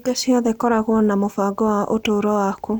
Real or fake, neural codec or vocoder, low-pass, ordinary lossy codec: real; none; none; none